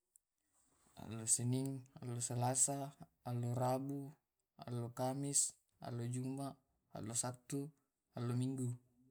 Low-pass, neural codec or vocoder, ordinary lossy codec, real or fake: none; none; none; real